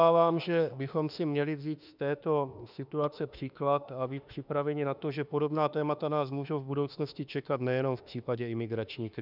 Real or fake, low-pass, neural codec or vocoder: fake; 5.4 kHz; autoencoder, 48 kHz, 32 numbers a frame, DAC-VAE, trained on Japanese speech